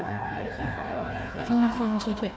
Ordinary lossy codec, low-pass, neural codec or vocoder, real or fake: none; none; codec, 16 kHz, 1 kbps, FunCodec, trained on Chinese and English, 50 frames a second; fake